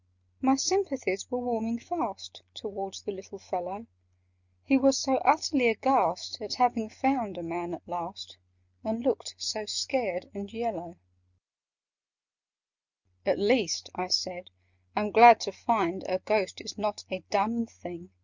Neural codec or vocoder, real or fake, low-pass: none; real; 7.2 kHz